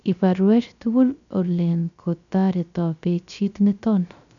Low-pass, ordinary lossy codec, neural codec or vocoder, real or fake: 7.2 kHz; none; codec, 16 kHz, 0.3 kbps, FocalCodec; fake